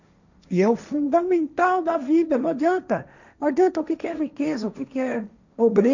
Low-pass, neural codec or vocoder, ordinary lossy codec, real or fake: 7.2 kHz; codec, 16 kHz, 1.1 kbps, Voila-Tokenizer; none; fake